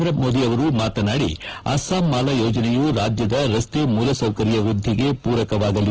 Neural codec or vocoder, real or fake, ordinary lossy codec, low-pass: none; real; Opus, 16 kbps; 7.2 kHz